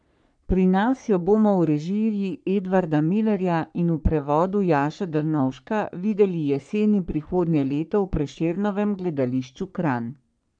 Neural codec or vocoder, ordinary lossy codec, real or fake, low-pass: codec, 44.1 kHz, 3.4 kbps, Pupu-Codec; none; fake; 9.9 kHz